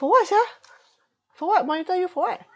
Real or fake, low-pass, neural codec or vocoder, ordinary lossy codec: real; none; none; none